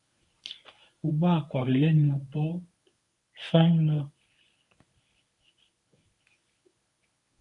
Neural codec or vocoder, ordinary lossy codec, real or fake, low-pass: codec, 24 kHz, 0.9 kbps, WavTokenizer, medium speech release version 1; MP3, 96 kbps; fake; 10.8 kHz